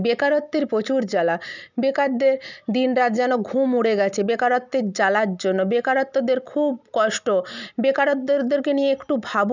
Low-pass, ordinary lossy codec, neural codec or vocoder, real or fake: 7.2 kHz; none; none; real